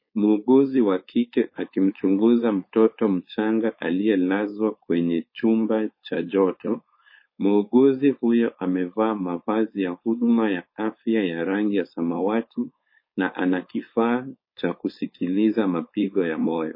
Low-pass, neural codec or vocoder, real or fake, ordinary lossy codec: 5.4 kHz; codec, 16 kHz, 4.8 kbps, FACodec; fake; MP3, 24 kbps